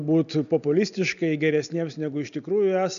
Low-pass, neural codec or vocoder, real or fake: 7.2 kHz; none; real